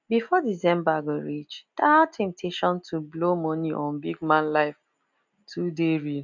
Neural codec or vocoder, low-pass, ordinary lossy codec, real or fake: none; 7.2 kHz; none; real